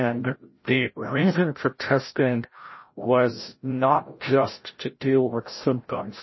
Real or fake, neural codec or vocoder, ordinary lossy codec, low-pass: fake; codec, 16 kHz, 0.5 kbps, FreqCodec, larger model; MP3, 24 kbps; 7.2 kHz